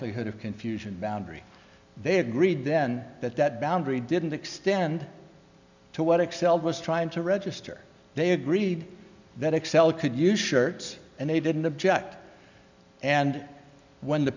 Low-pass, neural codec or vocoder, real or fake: 7.2 kHz; none; real